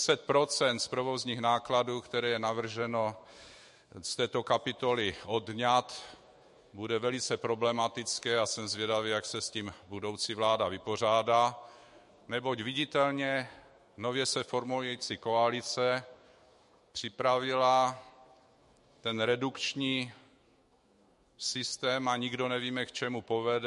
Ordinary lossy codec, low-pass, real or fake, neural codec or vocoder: MP3, 48 kbps; 14.4 kHz; fake; autoencoder, 48 kHz, 128 numbers a frame, DAC-VAE, trained on Japanese speech